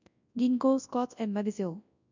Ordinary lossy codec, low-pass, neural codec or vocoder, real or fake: none; 7.2 kHz; codec, 24 kHz, 0.9 kbps, WavTokenizer, large speech release; fake